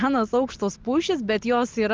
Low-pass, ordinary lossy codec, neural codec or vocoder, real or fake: 7.2 kHz; Opus, 32 kbps; none; real